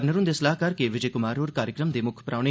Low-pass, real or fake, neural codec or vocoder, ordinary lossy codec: none; real; none; none